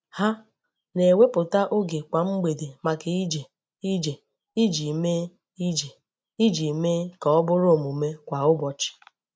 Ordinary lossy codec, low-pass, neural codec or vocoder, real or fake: none; none; none; real